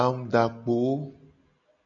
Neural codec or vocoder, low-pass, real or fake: none; 7.2 kHz; real